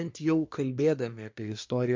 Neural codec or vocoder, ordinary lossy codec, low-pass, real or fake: codec, 24 kHz, 1 kbps, SNAC; MP3, 48 kbps; 7.2 kHz; fake